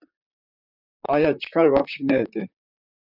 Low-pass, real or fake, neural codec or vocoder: 5.4 kHz; fake; vocoder, 44.1 kHz, 128 mel bands, Pupu-Vocoder